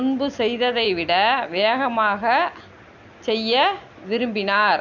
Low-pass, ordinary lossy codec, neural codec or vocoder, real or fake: 7.2 kHz; none; none; real